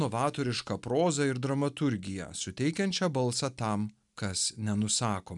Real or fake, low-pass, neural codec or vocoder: real; 10.8 kHz; none